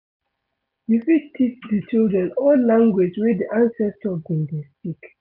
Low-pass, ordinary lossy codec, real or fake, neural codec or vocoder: 5.4 kHz; none; real; none